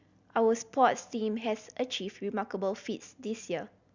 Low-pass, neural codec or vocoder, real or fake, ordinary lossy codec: 7.2 kHz; none; real; Opus, 64 kbps